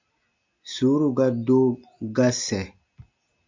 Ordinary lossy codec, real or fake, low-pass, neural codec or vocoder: MP3, 64 kbps; real; 7.2 kHz; none